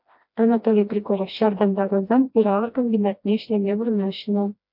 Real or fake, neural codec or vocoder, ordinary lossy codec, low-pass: fake; codec, 16 kHz, 1 kbps, FreqCodec, smaller model; AAC, 48 kbps; 5.4 kHz